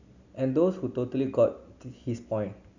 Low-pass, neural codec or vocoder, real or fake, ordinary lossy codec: 7.2 kHz; none; real; none